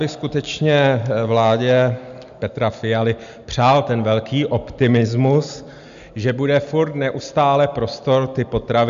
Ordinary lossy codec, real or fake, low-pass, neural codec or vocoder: MP3, 64 kbps; real; 7.2 kHz; none